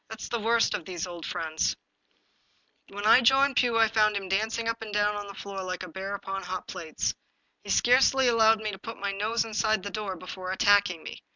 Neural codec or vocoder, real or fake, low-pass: none; real; 7.2 kHz